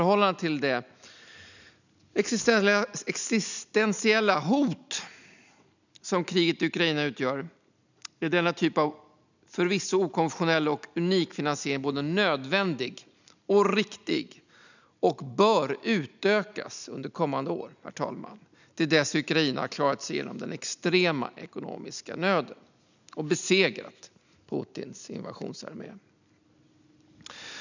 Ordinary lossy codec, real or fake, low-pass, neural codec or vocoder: none; real; 7.2 kHz; none